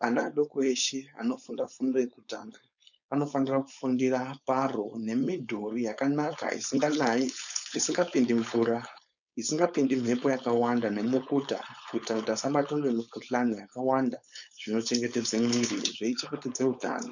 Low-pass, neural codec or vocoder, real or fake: 7.2 kHz; codec, 16 kHz, 4.8 kbps, FACodec; fake